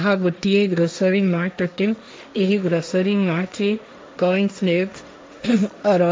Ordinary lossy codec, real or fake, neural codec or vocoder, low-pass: none; fake; codec, 16 kHz, 1.1 kbps, Voila-Tokenizer; none